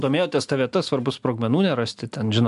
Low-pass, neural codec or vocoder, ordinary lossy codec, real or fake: 10.8 kHz; none; AAC, 96 kbps; real